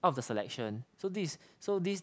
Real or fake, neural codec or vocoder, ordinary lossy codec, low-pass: real; none; none; none